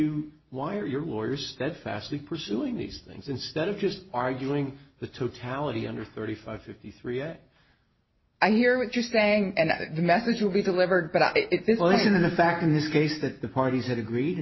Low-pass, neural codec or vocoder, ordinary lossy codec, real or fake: 7.2 kHz; none; MP3, 24 kbps; real